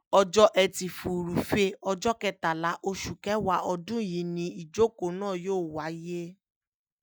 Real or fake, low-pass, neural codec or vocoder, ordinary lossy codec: real; none; none; none